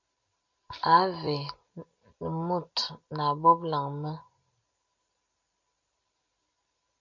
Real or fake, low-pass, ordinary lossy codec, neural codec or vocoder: real; 7.2 kHz; MP3, 48 kbps; none